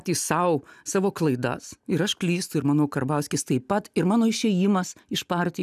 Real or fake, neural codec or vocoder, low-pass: real; none; 14.4 kHz